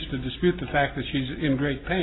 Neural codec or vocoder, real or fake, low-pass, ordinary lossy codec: none; real; 7.2 kHz; AAC, 16 kbps